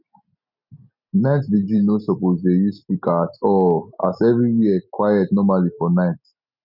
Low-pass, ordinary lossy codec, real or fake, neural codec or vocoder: 5.4 kHz; none; real; none